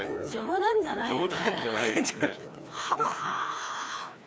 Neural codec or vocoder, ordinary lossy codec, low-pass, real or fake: codec, 16 kHz, 2 kbps, FreqCodec, larger model; none; none; fake